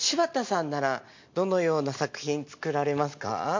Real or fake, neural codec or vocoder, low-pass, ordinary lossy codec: real; none; 7.2 kHz; MP3, 48 kbps